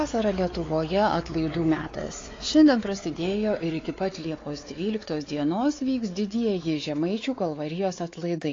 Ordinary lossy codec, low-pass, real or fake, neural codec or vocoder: AAC, 32 kbps; 7.2 kHz; fake; codec, 16 kHz, 4 kbps, X-Codec, WavLM features, trained on Multilingual LibriSpeech